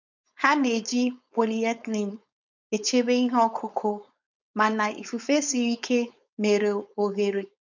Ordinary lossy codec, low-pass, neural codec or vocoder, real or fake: none; 7.2 kHz; codec, 16 kHz, 4.8 kbps, FACodec; fake